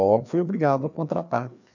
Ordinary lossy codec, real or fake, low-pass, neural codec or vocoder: none; fake; 7.2 kHz; codec, 16 kHz, 2 kbps, FreqCodec, larger model